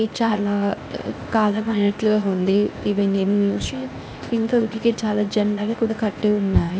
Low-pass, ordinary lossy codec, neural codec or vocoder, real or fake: none; none; codec, 16 kHz, 0.8 kbps, ZipCodec; fake